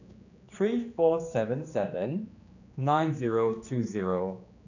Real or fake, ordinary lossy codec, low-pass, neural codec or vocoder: fake; none; 7.2 kHz; codec, 16 kHz, 2 kbps, X-Codec, HuBERT features, trained on general audio